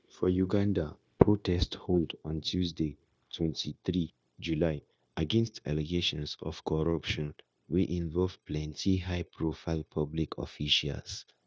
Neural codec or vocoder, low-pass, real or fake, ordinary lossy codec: codec, 16 kHz, 0.9 kbps, LongCat-Audio-Codec; none; fake; none